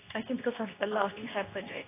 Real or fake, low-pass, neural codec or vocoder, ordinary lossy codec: fake; 3.6 kHz; codec, 24 kHz, 0.9 kbps, WavTokenizer, medium speech release version 2; AAC, 24 kbps